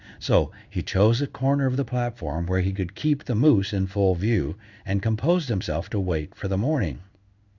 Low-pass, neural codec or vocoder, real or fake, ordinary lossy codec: 7.2 kHz; codec, 16 kHz in and 24 kHz out, 1 kbps, XY-Tokenizer; fake; Opus, 64 kbps